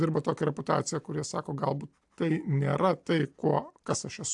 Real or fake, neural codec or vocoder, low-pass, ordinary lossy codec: real; none; 10.8 kHz; AAC, 64 kbps